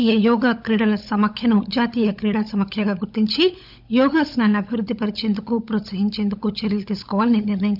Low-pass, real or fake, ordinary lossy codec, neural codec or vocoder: 5.4 kHz; fake; none; codec, 16 kHz, 16 kbps, FunCodec, trained on LibriTTS, 50 frames a second